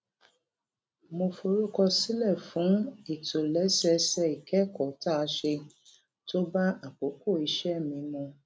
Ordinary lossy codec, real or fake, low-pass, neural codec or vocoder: none; real; none; none